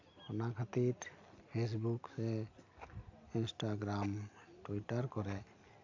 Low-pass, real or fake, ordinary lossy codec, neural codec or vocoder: 7.2 kHz; real; AAC, 48 kbps; none